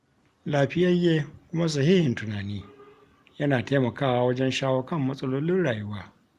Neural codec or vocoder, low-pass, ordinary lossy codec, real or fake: none; 14.4 kHz; Opus, 32 kbps; real